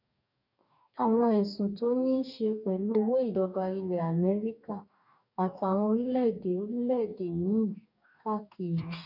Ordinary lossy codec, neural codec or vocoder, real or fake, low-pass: none; codec, 44.1 kHz, 2.6 kbps, DAC; fake; 5.4 kHz